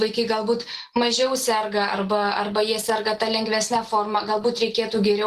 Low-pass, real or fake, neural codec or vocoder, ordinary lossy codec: 14.4 kHz; real; none; Opus, 16 kbps